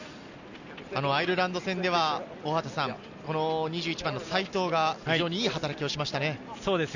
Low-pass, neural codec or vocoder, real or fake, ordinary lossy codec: 7.2 kHz; none; real; none